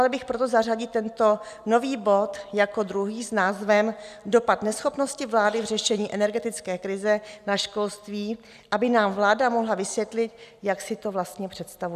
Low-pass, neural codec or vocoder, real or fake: 14.4 kHz; none; real